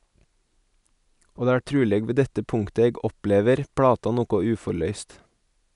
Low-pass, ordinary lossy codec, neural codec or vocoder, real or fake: 10.8 kHz; none; none; real